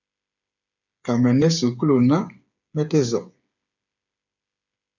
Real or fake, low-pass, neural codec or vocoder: fake; 7.2 kHz; codec, 16 kHz, 8 kbps, FreqCodec, smaller model